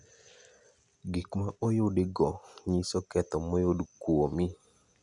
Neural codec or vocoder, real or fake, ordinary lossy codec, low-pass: none; real; none; 10.8 kHz